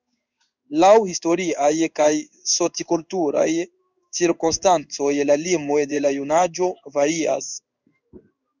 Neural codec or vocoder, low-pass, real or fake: codec, 16 kHz in and 24 kHz out, 1 kbps, XY-Tokenizer; 7.2 kHz; fake